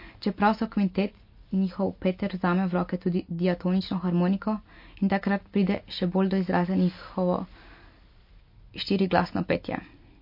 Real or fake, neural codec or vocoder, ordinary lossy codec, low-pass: real; none; MP3, 32 kbps; 5.4 kHz